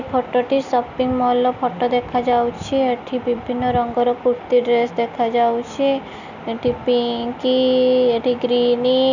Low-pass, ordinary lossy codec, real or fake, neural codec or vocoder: 7.2 kHz; none; real; none